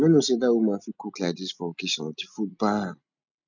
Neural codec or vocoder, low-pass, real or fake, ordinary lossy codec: none; 7.2 kHz; real; none